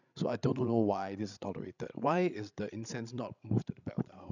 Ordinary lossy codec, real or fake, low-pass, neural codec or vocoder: none; fake; 7.2 kHz; codec, 16 kHz, 16 kbps, FreqCodec, larger model